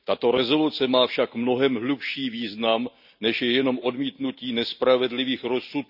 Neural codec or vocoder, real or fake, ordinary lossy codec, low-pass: none; real; none; 5.4 kHz